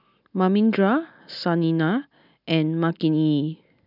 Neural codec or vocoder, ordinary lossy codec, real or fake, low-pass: none; none; real; 5.4 kHz